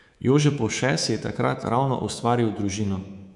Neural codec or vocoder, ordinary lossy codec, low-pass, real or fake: codec, 24 kHz, 3.1 kbps, DualCodec; none; 10.8 kHz; fake